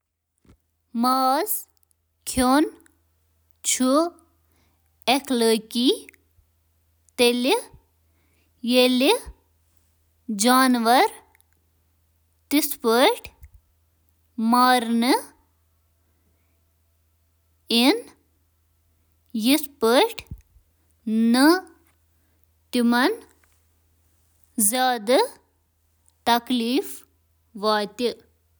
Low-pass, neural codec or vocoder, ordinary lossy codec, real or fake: none; none; none; real